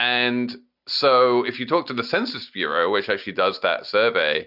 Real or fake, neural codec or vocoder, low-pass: real; none; 5.4 kHz